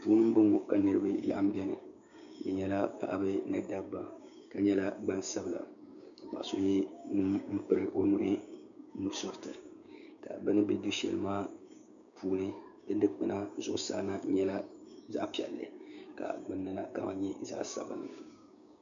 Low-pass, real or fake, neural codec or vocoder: 7.2 kHz; fake; codec, 16 kHz, 6 kbps, DAC